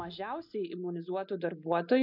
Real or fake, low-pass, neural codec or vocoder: real; 5.4 kHz; none